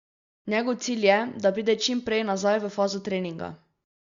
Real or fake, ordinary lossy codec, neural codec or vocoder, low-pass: real; Opus, 64 kbps; none; 7.2 kHz